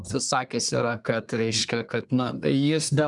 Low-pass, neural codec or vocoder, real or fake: 10.8 kHz; codec, 24 kHz, 1 kbps, SNAC; fake